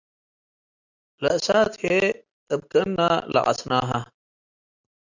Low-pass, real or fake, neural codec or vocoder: 7.2 kHz; real; none